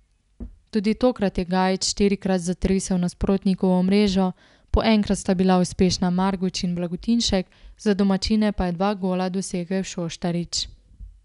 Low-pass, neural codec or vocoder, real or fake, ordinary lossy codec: 10.8 kHz; none; real; none